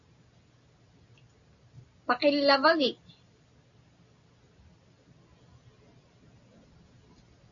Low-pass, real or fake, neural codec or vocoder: 7.2 kHz; real; none